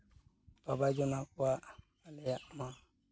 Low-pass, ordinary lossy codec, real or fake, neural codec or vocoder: none; none; real; none